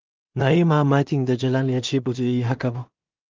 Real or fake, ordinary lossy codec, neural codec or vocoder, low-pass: fake; Opus, 24 kbps; codec, 16 kHz in and 24 kHz out, 0.4 kbps, LongCat-Audio-Codec, two codebook decoder; 7.2 kHz